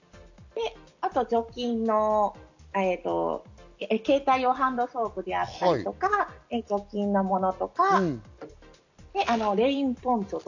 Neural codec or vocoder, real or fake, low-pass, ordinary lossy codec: none; real; 7.2 kHz; none